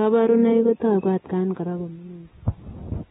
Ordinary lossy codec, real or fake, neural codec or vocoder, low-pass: AAC, 16 kbps; real; none; 19.8 kHz